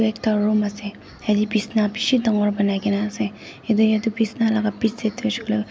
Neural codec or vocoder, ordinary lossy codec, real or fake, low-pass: none; none; real; none